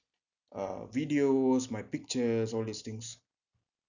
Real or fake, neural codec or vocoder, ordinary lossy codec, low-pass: real; none; none; 7.2 kHz